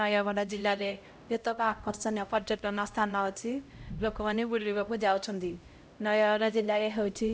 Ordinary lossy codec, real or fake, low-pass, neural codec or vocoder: none; fake; none; codec, 16 kHz, 0.5 kbps, X-Codec, HuBERT features, trained on LibriSpeech